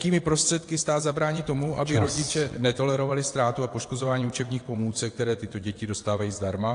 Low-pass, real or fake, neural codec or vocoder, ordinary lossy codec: 9.9 kHz; fake; vocoder, 22.05 kHz, 80 mel bands, WaveNeXt; AAC, 48 kbps